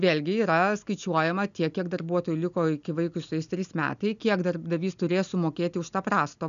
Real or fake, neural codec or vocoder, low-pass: real; none; 7.2 kHz